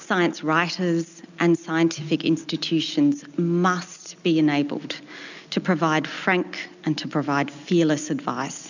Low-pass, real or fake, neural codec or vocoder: 7.2 kHz; real; none